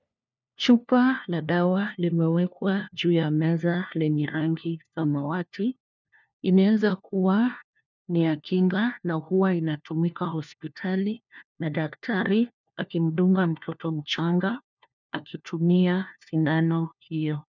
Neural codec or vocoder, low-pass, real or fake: codec, 16 kHz, 1 kbps, FunCodec, trained on LibriTTS, 50 frames a second; 7.2 kHz; fake